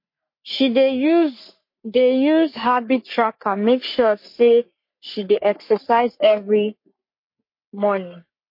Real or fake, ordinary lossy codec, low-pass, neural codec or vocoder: fake; MP3, 32 kbps; 5.4 kHz; codec, 44.1 kHz, 3.4 kbps, Pupu-Codec